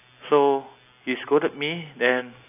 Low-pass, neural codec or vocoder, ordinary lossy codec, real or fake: 3.6 kHz; none; AAC, 24 kbps; real